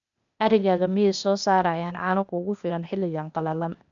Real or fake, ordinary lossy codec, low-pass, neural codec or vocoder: fake; none; 7.2 kHz; codec, 16 kHz, 0.8 kbps, ZipCodec